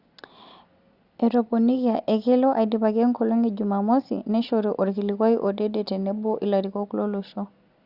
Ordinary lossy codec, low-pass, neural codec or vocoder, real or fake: Opus, 64 kbps; 5.4 kHz; none; real